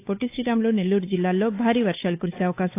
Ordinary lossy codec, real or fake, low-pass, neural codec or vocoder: AAC, 24 kbps; fake; 3.6 kHz; codec, 16 kHz, 8 kbps, FunCodec, trained on Chinese and English, 25 frames a second